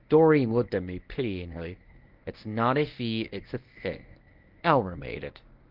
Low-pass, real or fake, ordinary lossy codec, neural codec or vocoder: 5.4 kHz; fake; Opus, 32 kbps; codec, 24 kHz, 0.9 kbps, WavTokenizer, medium speech release version 1